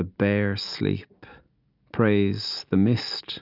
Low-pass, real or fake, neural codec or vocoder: 5.4 kHz; real; none